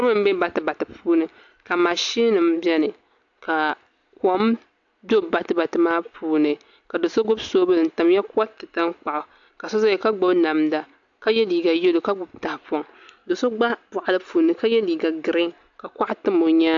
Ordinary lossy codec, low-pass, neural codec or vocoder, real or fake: AAC, 64 kbps; 7.2 kHz; none; real